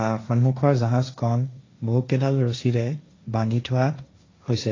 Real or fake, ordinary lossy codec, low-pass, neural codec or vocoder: fake; AAC, 32 kbps; 7.2 kHz; codec, 16 kHz, 1.1 kbps, Voila-Tokenizer